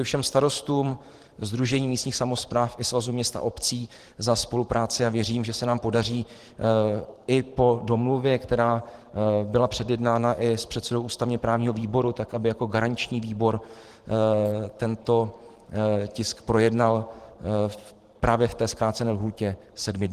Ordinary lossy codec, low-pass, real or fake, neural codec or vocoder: Opus, 16 kbps; 14.4 kHz; fake; vocoder, 44.1 kHz, 128 mel bands every 512 samples, BigVGAN v2